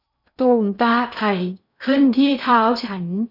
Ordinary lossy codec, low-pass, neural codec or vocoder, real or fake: none; 5.4 kHz; codec, 16 kHz in and 24 kHz out, 0.6 kbps, FocalCodec, streaming, 2048 codes; fake